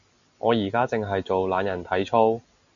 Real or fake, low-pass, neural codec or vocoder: real; 7.2 kHz; none